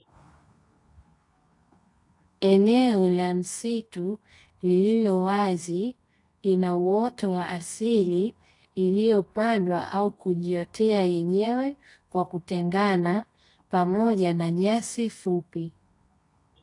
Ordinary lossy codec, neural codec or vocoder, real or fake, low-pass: AAC, 48 kbps; codec, 24 kHz, 0.9 kbps, WavTokenizer, medium music audio release; fake; 10.8 kHz